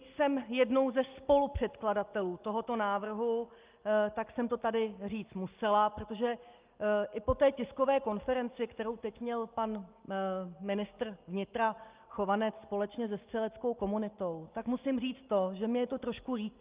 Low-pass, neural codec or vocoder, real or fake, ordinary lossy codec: 3.6 kHz; none; real; Opus, 24 kbps